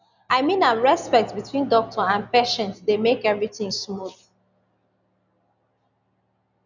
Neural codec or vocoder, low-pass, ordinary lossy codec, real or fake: none; 7.2 kHz; none; real